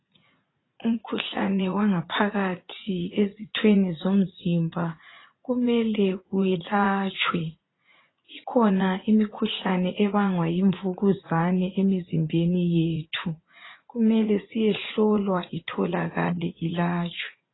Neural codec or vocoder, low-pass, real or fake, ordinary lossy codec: vocoder, 44.1 kHz, 80 mel bands, Vocos; 7.2 kHz; fake; AAC, 16 kbps